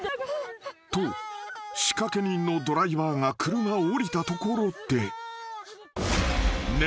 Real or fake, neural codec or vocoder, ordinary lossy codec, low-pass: real; none; none; none